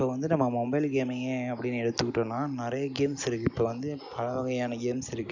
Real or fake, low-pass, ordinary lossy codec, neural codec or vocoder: real; 7.2 kHz; none; none